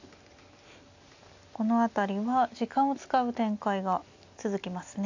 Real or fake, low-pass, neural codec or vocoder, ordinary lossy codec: real; 7.2 kHz; none; AAC, 48 kbps